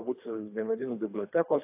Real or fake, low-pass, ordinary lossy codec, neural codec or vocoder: fake; 3.6 kHz; AAC, 24 kbps; codec, 24 kHz, 3 kbps, HILCodec